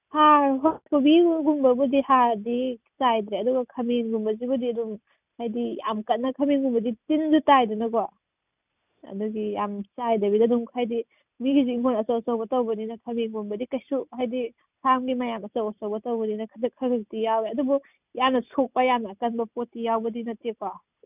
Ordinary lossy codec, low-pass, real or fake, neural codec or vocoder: none; 3.6 kHz; real; none